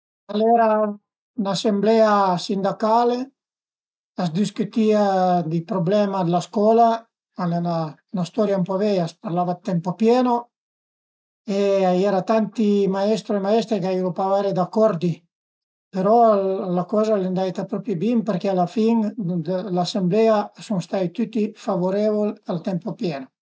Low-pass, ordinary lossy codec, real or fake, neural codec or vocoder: none; none; real; none